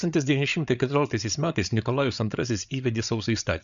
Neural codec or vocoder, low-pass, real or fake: codec, 16 kHz, 8 kbps, FreqCodec, larger model; 7.2 kHz; fake